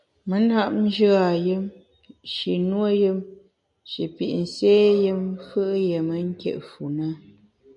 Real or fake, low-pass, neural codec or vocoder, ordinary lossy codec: real; 10.8 kHz; none; MP3, 64 kbps